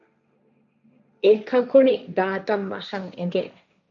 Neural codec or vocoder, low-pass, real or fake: codec, 16 kHz, 1.1 kbps, Voila-Tokenizer; 7.2 kHz; fake